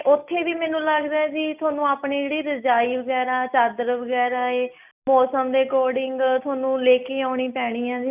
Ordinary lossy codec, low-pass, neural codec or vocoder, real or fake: none; 3.6 kHz; none; real